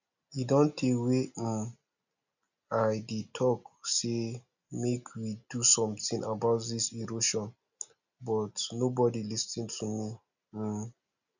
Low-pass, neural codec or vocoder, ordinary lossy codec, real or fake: 7.2 kHz; none; none; real